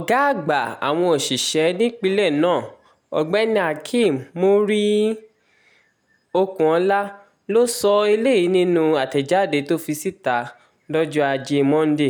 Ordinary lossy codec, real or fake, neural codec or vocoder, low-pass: none; real; none; none